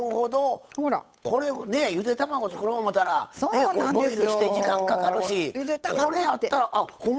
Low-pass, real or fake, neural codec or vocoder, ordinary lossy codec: none; fake; codec, 16 kHz, 8 kbps, FunCodec, trained on Chinese and English, 25 frames a second; none